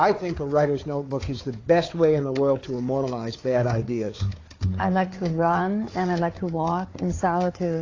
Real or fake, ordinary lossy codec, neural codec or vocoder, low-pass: fake; AAC, 32 kbps; codec, 16 kHz, 4 kbps, FunCodec, trained on Chinese and English, 50 frames a second; 7.2 kHz